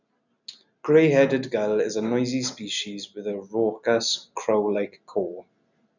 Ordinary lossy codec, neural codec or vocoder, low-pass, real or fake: none; none; 7.2 kHz; real